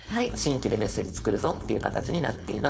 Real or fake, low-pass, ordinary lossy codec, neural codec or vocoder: fake; none; none; codec, 16 kHz, 4.8 kbps, FACodec